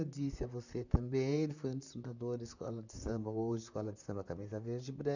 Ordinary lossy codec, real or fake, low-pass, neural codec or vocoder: none; fake; 7.2 kHz; vocoder, 44.1 kHz, 128 mel bands, Pupu-Vocoder